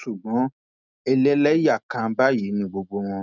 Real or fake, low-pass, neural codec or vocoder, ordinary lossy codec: real; 7.2 kHz; none; none